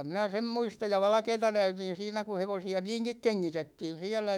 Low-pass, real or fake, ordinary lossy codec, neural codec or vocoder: 19.8 kHz; fake; none; autoencoder, 48 kHz, 32 numbers a frame, DAC-VAE, trained on Japanese speech